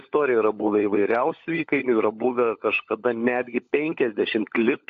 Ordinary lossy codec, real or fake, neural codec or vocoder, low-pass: AAC, 48 kbps; fake; codec, 16 kHz, 16 kbps, FunCodec, trained on LibriTTS, 50 frames a second; 7.2 kHz